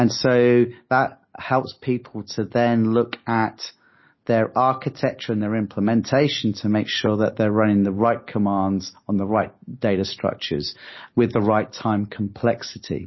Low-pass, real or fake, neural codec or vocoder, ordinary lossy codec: 7.2 kHz; real; none; MP3, 24 kbps